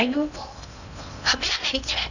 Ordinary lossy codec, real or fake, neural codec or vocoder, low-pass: none; fake; codec, 16 kHz in and 24 kHz out, 0.6 kbps, FocalCodec, streaming, 4096 codes; 7.2 kHz